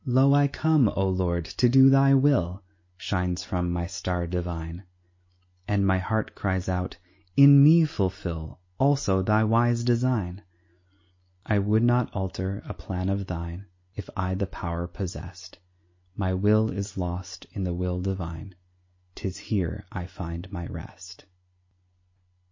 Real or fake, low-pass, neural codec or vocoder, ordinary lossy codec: real; 7.2 kHz; none; MP3, 32 kbps